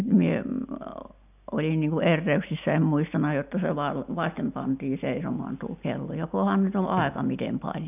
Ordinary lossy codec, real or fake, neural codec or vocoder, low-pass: none; real; none; 3.6 kHz